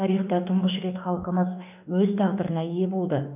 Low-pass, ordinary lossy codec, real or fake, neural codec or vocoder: 3.6 kHz; none; fake; autoencoder, 48 kHz, 32 numbers a frame, DAC-VAE, trained on Japanese speech